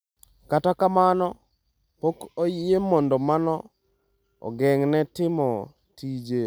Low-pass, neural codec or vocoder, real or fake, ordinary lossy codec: none; none; real; none